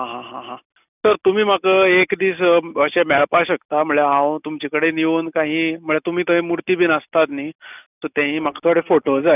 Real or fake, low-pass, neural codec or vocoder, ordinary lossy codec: real; 3.6 kHz; none; none